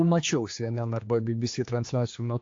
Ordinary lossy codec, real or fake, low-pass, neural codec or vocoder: AAC, 48 kbps; fake; 7.2 kHz; codec, 16 kHz, 2 kbps, X-Codec, HuBERT features, trained on general audio